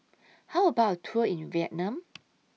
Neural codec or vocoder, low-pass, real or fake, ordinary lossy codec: none; none; real; none